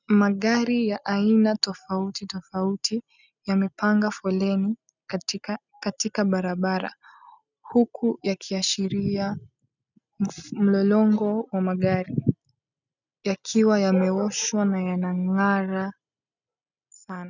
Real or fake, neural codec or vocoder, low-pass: real; none; 7.2 kHz